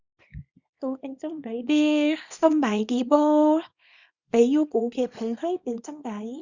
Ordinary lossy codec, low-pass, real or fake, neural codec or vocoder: Opus, 64 kbps; 7.2 kHz; fake; codec, 24 kHz, 0.9 kbps, WavTokenizer, small release